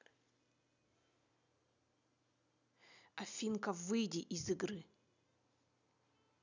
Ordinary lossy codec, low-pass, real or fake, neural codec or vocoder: none; 7.2 kHz; real; none